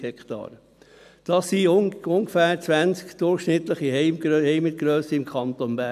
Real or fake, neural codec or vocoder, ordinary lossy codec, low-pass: real; none; none; 14.4 kHz